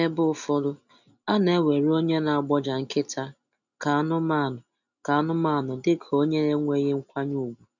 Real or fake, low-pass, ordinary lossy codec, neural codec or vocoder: real; 7.2 kHz; none; none